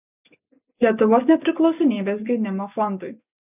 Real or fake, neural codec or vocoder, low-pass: real; none; 3.6 kHz